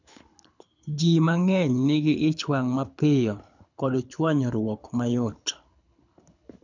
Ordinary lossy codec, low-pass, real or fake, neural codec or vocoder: none; 7.2 kHz; fake; codec, 24 kHz, 6 kbps, HILCodec